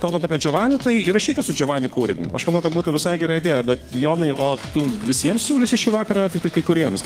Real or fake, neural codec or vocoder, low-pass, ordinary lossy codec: fake; codec, 32 kHz, 1.9 kbps, SNAC; 14.4 kHz; Opus, 24 kbps